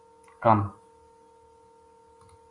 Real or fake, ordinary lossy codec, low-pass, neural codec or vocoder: real; AAC, 48 kbps; 10.8 kHz; none